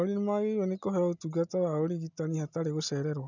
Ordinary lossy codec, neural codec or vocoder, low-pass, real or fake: none; none; 7.2 kHz; real